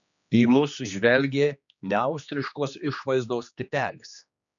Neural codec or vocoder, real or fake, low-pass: codec, 16 kHz, 2 kbps, X-Codec, HuBERT features, trained on general audio; fake; 7.2 kHz